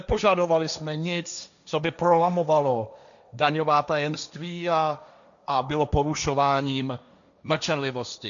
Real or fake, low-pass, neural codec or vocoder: fake; 7.2 kHz; codec, 16 kHz, 1.1 kbps, Voila-Tokenizer